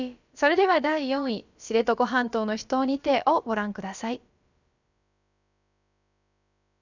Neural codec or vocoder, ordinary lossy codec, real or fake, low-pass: codec, 16 kHz, about 1 kbps, DyCAST, with the encoder's durations; none; fake; 7.2 kHz